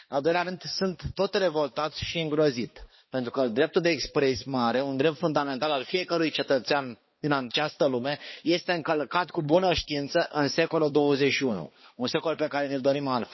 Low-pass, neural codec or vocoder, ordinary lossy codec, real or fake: 7.2 kHz; codec, 16 kHz, 2 kbps, X-Codec, HuBERT features, trained on balanced general audio; MP3, 24 kbps; fake